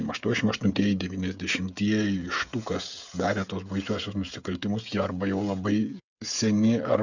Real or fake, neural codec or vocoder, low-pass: fake; codec, 16 kHz, 16 kbps, FreqCodec, smaller model; 7.2 kHz